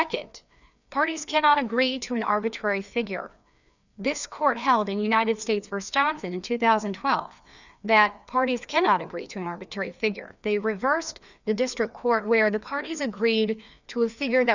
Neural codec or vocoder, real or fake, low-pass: codec, 16 kHz, 2 kbps, FreqCodec, larger model; fake; 7.2 kHz